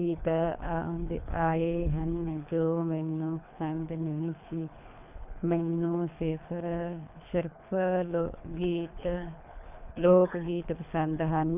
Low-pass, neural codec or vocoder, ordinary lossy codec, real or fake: 3.6 kHz; codec, 24 kHz, 3 kbps, HILCodec; none; fake